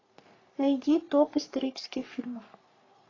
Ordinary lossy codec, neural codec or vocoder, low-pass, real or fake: AAC, 32 kbps; codec, 44.1 kHz, 3.4 kbps, Pupu-Codec; 7.2 kHz; fake